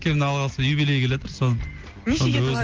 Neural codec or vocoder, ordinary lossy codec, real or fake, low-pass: none; Opus, 16 kbps; real; 7.2 kHz